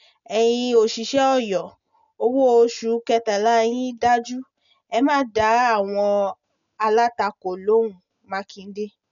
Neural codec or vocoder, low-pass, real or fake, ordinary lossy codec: none; 7.2 kHz; real; none